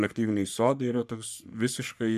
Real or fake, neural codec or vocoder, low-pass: fake; codec, 44.1 kHz, 3.4 kbps, Pupu-Codec; 14.4 kHz